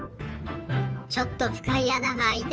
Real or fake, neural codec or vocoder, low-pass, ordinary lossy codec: fake; codec, 16 kHz, 2 kbps, FunCodec, trained on Chinese and English, 25 frames a second; none; none